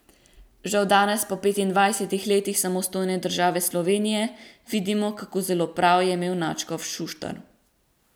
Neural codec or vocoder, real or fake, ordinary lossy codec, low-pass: none; real; none; none